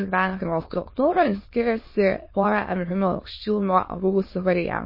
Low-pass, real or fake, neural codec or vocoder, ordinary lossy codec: 5.4 kHz; fake; autoencoder, 22.05 kHz, a latent of 192 numbers a frame, VITS, trained on many speakers; MP3, 24 kbps